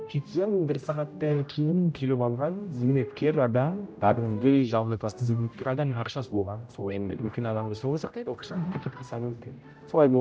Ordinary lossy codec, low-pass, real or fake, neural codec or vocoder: none; none; fake; codec, 16 kHz, 0.5 kbps, X-Codec, HuBERT features, trained on general audio